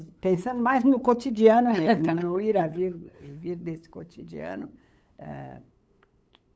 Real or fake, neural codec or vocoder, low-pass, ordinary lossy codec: fake; codec, 16 kHz, 8 kbps, FunCodec, trained on LibriTTS, 25 frames a second; none; none